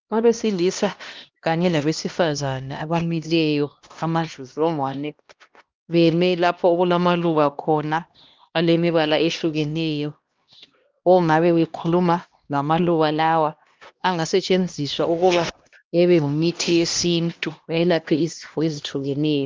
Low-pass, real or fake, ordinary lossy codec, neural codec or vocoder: 7.2 kHz; fake; Opus, 24 kbps; codec, 16 kHz, 1 kbps, X-Codec, HuBERT features, trained on LibriSpeech